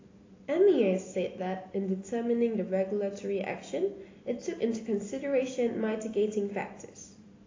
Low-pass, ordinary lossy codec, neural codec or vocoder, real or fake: 7.2 kHz; AAC, 32 kbps; none; real